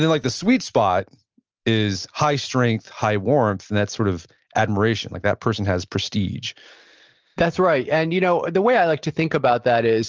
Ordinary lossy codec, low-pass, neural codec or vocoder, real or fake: Opus, 24 kbps; 7.2 kHz; none; real